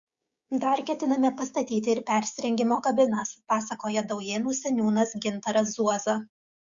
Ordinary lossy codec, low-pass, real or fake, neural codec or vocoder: Opus, 64 kbps; 7.2 kHz; fake; codec, 16 kHz, 6 kbps, DAC